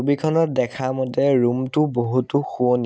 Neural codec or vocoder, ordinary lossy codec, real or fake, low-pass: none; none; real; none